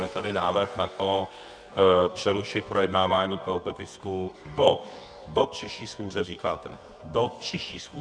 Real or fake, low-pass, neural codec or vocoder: fake; 9.9 kHz; codec, 24 kHz, 0.9 kbps, WavTokenizer, medium music audio release